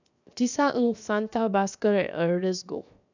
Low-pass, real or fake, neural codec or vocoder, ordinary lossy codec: 7.2 kHz; fake; codec, 16 kHz, 0.7 kbps, FocalCodec; none